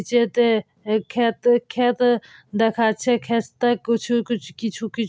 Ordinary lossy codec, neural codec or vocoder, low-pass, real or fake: none; none; none; real